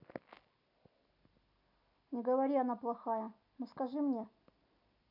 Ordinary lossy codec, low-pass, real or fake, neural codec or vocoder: none; 5.4 kHz; real; none